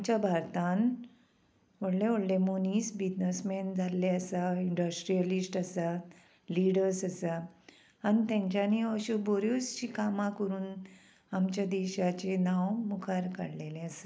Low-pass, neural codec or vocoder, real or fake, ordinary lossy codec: none; none; real; none